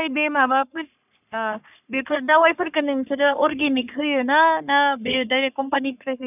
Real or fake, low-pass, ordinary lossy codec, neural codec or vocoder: fake; 3.6 kHz; none; codec, 44.1 kHz, 3.4 kbps, Pupu-Codec